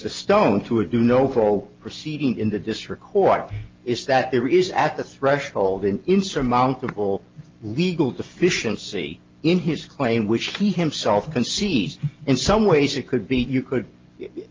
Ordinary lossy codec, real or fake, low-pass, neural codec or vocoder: Opus, 32 kbps; real; 7.2 kHz; none